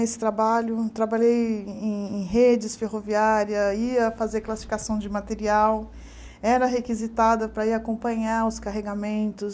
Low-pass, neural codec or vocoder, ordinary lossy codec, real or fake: none; none; none; real